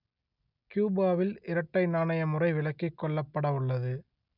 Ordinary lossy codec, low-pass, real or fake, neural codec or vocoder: none; 5.4 kHz; real; none